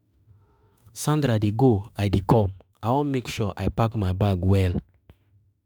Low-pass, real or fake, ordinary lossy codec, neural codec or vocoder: none; fake; none; autoencoder, 48 kHz, 32 numbers a frame, DAC-VAE, trained on Japanese speech